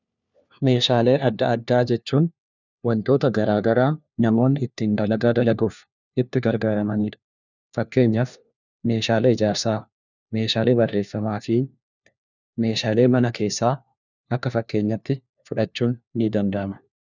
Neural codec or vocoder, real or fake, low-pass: codec, 16 kHz, 1 kbps, FunCodec, trained on LibriTTS, 50 frames a second; fake; 7.2 kHz